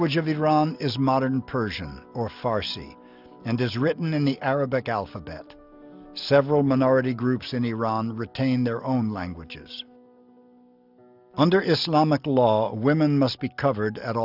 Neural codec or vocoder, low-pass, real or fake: none; 5.4 kHz; real